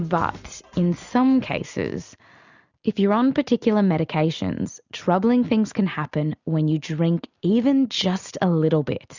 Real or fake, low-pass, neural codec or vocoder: real; 7.2 kHz; none